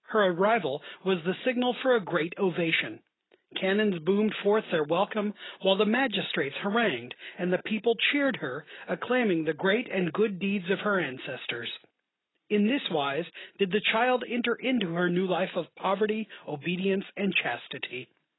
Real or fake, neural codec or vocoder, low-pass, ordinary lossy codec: real; none; 7.2 kHz; AAC, 16 kbps